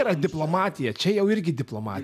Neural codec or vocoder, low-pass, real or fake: none; 14.4 kHz; real